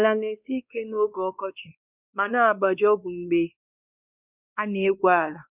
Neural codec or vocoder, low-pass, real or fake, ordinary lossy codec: codec, 16 kHz, 2 kbps, X-Codec, WavLM features, trained on Multilingual LibriSpeech; 3.6 kHz; fake; none